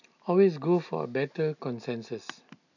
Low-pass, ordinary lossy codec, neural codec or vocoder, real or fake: 7.2 kHz; none; none; real